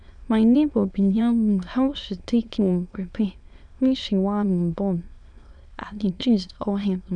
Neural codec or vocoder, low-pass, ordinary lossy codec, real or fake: autoencoder, 22.05 kHz, a latent of 192 numbers a frame, VITS, trained on many speakers; 9.9 kHz; none; fake